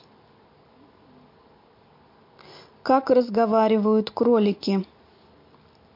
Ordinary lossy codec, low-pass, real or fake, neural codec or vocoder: MP3, 32 kbps; 5.4 kHz; real; none